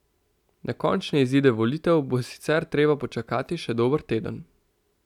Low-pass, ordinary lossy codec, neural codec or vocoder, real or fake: 19.8 kHz; none; none; real